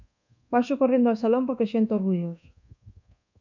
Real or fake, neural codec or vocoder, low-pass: fake; codec, 24 kHz, 1.2 kbps, DualCodec; 7.2 kHz